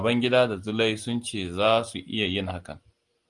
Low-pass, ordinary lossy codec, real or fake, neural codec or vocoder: 10.8 kHz; Opus, 24 kbps; real; none